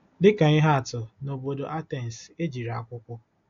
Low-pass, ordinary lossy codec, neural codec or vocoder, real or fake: 7.2 kHz; AAC, 48 kbps; none; real